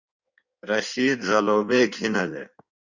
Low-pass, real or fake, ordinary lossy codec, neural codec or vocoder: 7.2 kHz; fake; Opus, 64 kbps; codec, 16 kHz in and 24 kHz out, 1.1 kbps, FireRedTTS-2 codec